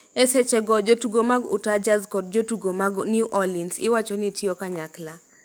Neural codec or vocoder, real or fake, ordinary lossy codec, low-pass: codec, 44.1 kHz, 7.8 kbps, DAC; fake; none; none